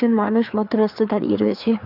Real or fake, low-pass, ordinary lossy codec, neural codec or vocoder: fake; 5.4 kHz; AAC, 48 kbps; codec, 16 kHz, 4 kbps, FreqCodec, larger model